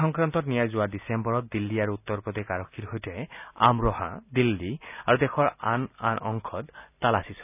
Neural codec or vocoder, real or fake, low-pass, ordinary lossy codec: none; real; 3.6 kHz; none